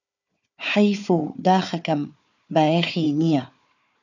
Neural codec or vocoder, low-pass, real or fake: codec, 16 kHz, 4 kbps, FunCodec, trained on Chinese and English, 50 frames a second; 7.2 kHz; fake